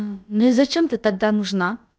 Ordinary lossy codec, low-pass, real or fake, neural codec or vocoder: none; none; fake; codec, 16 kHz, about 1 kbps, DyCAST, with the encoder's durations